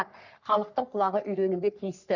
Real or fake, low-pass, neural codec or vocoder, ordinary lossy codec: fake; 7.2 kHz; codec, 44.1 kHz, 3.4 kbps, Pupu-Codec; none